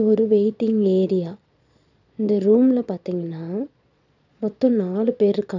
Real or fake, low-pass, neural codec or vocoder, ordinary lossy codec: fake; 7.2 kHz; vocoder, 22.05 kHz, 80 mel bands, Vocos; none